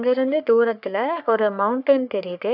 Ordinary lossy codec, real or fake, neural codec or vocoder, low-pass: none; fake; codec, 44.1 kHz, 3.4 kbps, Pupu-Codec; 5.4 kHz